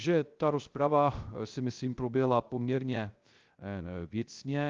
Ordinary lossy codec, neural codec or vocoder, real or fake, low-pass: Opus, 32 kbps; codec, 16 kHz, 0.3 kbps, FocalCodec; fake; 7.2 kHz